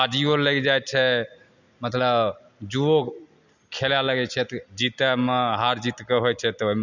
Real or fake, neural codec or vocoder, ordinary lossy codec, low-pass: real; none; none; 7.2 kHz